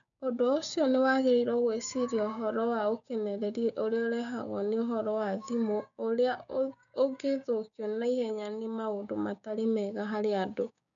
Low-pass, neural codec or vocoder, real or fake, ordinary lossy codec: 7.2 kHz; codec, 16 kHz, 6 kbps, DAC; fake; none